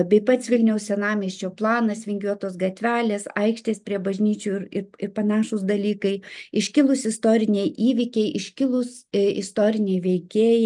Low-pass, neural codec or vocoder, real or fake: 10.8 kHz; none; real